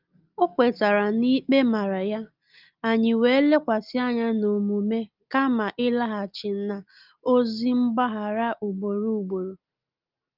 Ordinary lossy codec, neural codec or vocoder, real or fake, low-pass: Opus, 32 kbps; none; real; 5.4 kHz